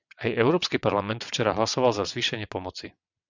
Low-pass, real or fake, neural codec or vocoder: 7.2 kHz; fake; vocoder, 22.05 kHz, 80 mel bands, WaveNeXt